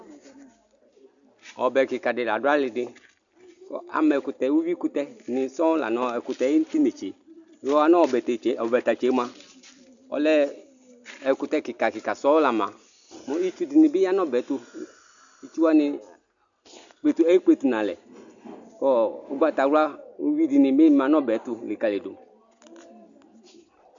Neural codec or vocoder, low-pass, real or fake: none; 7.2 kHz; real